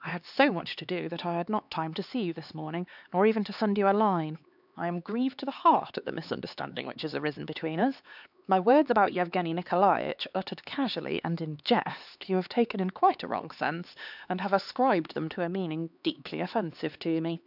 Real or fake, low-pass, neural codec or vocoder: fake; 5.4 kHz; codec, 16 kHz, 4 kbps, X-Codec, HuBERT features, trained on LibriSpeech